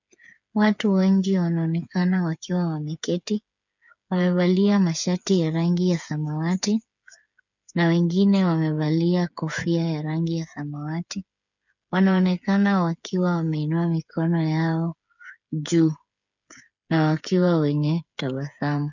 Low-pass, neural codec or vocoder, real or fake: 7.2 kHz; codec, 16 kHz, 8 kbps, FreqCodec, smaller model; fake